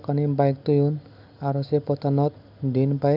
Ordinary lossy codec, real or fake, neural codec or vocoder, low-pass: none; real; none; 5.4 kHz